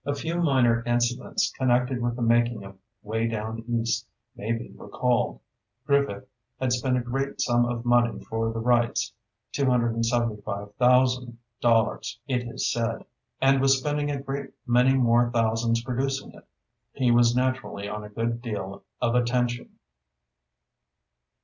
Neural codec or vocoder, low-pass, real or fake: none; 7.2 kHz; real